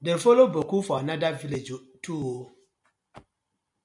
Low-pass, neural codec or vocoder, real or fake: 10.8 kHz; none; real